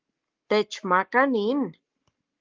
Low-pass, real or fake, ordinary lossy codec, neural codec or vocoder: 7.2 kHz; fake; Opus, 32 kbps; codec, 44.1 kHz, 7.8 kbps, Pupu-Codec